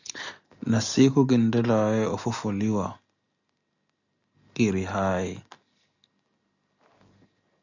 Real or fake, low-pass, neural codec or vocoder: real; 7.2 kHz; none